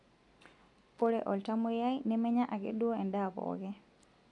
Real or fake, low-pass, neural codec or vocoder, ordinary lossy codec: real; 10.8 kHz; none; none